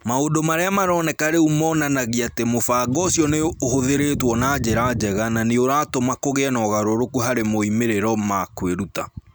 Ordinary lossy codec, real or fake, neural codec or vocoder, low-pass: none; real; none; none